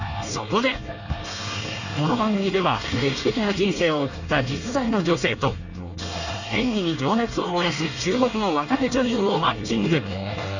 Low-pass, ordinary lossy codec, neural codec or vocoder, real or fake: 7.2 kHz; none; codec, 24 kHz, 1 kbps, SNAC; fake